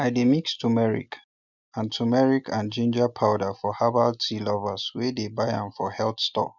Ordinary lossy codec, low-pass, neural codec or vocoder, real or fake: none; 7.2 kHz; none; real